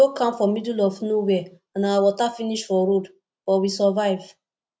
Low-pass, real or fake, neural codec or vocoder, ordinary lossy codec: none; real; none; none